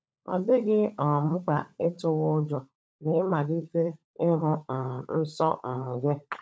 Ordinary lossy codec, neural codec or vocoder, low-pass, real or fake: none; codec, 16 kHz, 16 kbps, FunCodec, trained on LibriTTS, 50 frames a second; none; fake